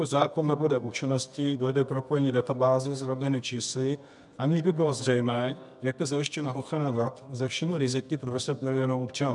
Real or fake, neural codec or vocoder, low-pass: fake; codec, 24 kHz, 0.9 kbps, WavTokenizer, medium music audio release; 10.8 kHz